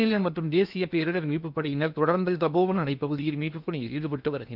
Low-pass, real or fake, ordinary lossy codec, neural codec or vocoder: 5.4 kHz; fake; none; codec, 16 kHz in and 24 kHz out, 0.8 kbps, FocalCodec, streaming, 65536 codes